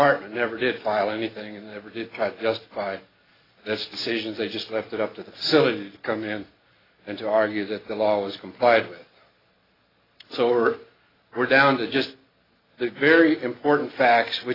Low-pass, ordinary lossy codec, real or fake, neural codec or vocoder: 5.4 kHz; AAC, 24 kbps; real; none